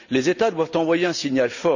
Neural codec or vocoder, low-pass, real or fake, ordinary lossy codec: none; 7.2 kHz; real; none